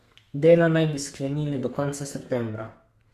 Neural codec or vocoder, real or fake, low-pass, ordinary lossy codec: codec, 32 kHz, 1.9 kbps, SNAC; fake; 14.4 kHz; Opus, 64 kbps